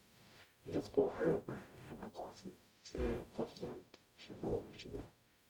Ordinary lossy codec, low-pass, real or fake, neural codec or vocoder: none; 19.8 kHz; fake; codec, 44.1 kHz, 0.9 kbps, DAC